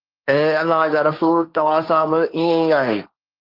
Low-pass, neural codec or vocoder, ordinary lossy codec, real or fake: 5.4 kHz; codec, 16 kHz, 1.1 kbps, Voila-Tokenizer; Opus, 24 kbps; fake